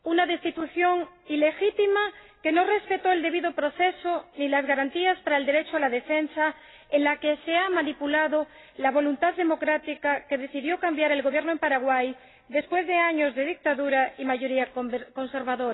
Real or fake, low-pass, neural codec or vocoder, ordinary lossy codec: real; 7.2 kHz; none; AAC, 16 kbps